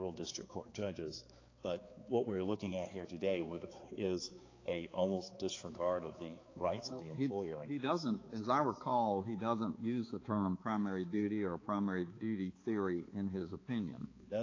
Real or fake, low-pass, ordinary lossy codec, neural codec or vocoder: fake; 7.2 kHz; AAC, 32 kbps; codec, 16 kHz, 4 kbps, X-Codec, HuBERT features, trained on balanced general audio